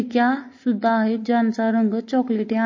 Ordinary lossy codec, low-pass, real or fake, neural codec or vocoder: MP3, 32 kbps; 7.2 kHz; real; none